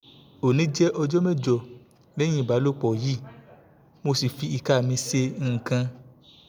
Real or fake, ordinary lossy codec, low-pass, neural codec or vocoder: real; none; none; none